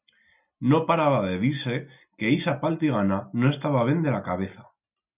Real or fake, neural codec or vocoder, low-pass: real; none; 3.6 kHz